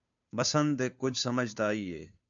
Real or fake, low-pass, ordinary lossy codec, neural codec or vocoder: fake; 7.2 kHz; AAC, 64 kbps; codec, 16 kHz, 2 kbps, FunCodec, trained on Chinese and English, 25 frames a second